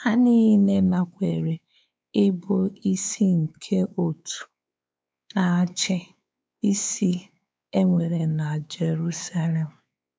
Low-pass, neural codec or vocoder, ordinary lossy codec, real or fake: none; codec, 16 kHz, 4 kbps, X-Codec, WavLM features, trained on Multilingual LibriSpeech; none; fake